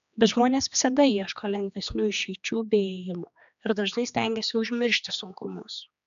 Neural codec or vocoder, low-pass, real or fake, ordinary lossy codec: codec, 16 kHz, 2 kbps, X-Codec, HuBERT features, trained on general audio; 7.2 kHz; fake; AAC, 96 kbps